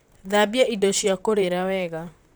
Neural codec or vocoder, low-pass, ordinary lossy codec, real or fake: vocoder, 44.1 kHz, 128 mel bands, Pupu-Vocoder; none; none; fake